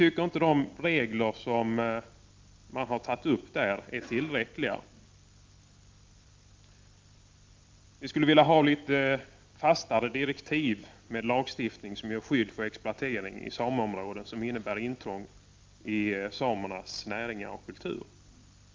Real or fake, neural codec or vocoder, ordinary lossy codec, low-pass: real; none; Opus, 24 kbps; 7.2 kHz